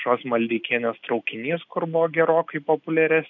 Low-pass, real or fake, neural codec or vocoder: 7.2 kHz; real; none